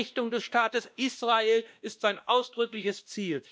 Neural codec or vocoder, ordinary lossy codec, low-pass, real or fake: codec, 16 kHz, 1 kbps, X-Codec, WavLM features, trained on Multilingual LibriSpeech; none; none; fake